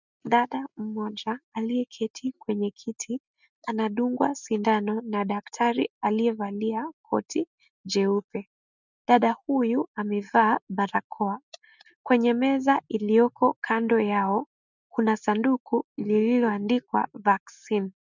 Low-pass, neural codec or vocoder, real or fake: 7.2 kHz; none; real